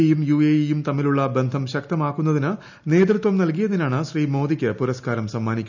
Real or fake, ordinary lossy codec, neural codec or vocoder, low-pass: real; none; none; 7.2 kHz